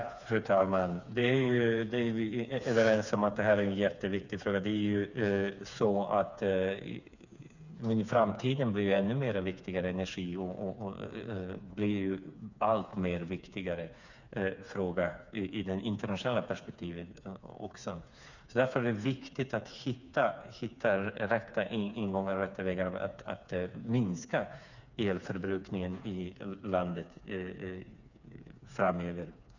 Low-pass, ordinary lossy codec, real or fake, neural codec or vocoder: 7.2 kHz; none; fake; codec, 16 kHz, 4 kbps, FreqCodec, smaller model